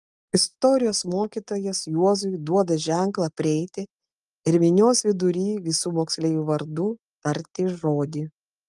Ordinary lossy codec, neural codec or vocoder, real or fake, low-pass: Opus, 32 kbps; none; real; 10.8 kHz